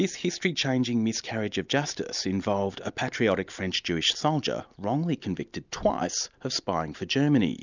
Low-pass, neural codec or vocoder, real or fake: 7.2 kHz; none; real